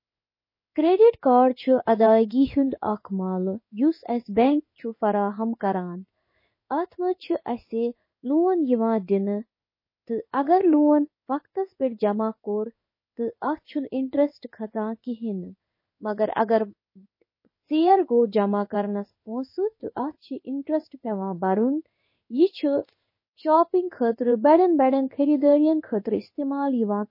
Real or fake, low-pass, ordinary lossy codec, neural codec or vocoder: fake; 5.4 kHz; MP3, 24 kbps; codec, 16 kHz in and 24 kHz out, 1 kbps, XY-Tokenizer